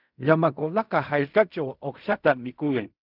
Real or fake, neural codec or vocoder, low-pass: fake; codec, 16 kHz in and 24 kHz out, 0.4 kbps, LongCat-Audio-Codec, fine tuned four codebook decoder; 5.4 kHz